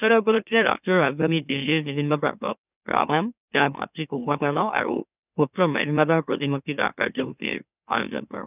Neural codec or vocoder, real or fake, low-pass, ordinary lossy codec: autoencoder, 44.1 kHz, a latent of 192 numbers a frame, MeloTTS; fake; 3.6 kHz; none